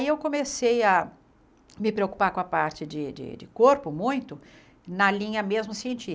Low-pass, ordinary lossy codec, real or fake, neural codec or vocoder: none; none; real; none